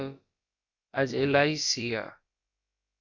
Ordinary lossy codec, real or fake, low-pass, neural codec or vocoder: Opus, 64 kbps; fake; 7.2 kHz; codec, 16 kHz, about 1 kbps, DyCAST, with the encoder's durations